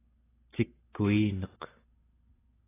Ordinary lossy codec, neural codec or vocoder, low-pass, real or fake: AAC, 16 kbps; none; 3.6 kHz; real